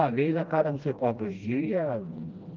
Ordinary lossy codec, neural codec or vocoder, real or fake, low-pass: Opus, 24 kbps; codec, 16 kHz, 1 kbps, FreqCodec, smaller model; fake; 7.2 kHz